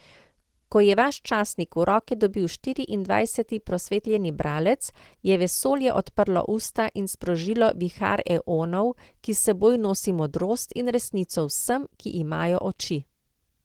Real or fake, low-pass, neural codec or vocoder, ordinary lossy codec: real; 19.8 kHz; none; Opus, 16 kbps